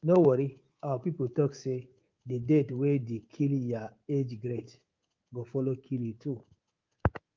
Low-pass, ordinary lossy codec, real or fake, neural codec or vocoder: 7.2 kHz; Opus, 32 kbps; fake; codec, 24 kHz, 3.1 kbps, DualCodec